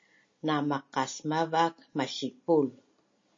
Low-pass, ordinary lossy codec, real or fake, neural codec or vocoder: 7.2 kHz; MP3, 32 kbps; real; none